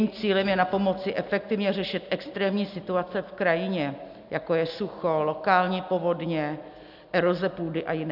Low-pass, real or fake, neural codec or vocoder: 5.4 kHz; real; none